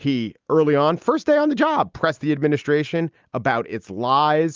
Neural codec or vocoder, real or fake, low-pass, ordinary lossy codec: none; real; 7.2 kHz; Opus, 24 kbps